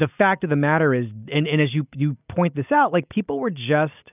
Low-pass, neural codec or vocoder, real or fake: 3.6 kHz; none; real